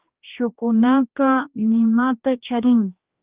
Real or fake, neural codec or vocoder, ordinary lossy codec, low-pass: fake; codec, 16 kHz, 1 kbps, X-Codec, HuBERT features, trained on general audio; Opus, 32 kbps; 3.6 kHz